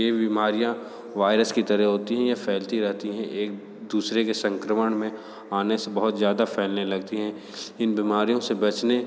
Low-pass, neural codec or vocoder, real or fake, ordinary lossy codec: none; none; real; none